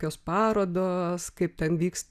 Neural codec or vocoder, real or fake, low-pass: none; real; 14.4 kHz